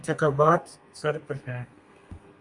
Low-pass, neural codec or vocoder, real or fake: 10.8 kHz; codec, 32 kHz, 1.9 kbps, SNAC; fake